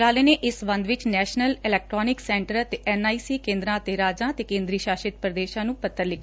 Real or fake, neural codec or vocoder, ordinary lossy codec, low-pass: real; none; none; none